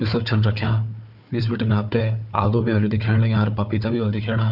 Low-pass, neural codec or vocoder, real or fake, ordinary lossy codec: 5.4 kHz; codec, 16 kHz, 4 kbps, FunCodec, trained on Chinese and English, 50 frames a second; fake; none